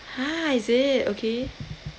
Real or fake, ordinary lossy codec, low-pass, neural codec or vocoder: real; none; none; none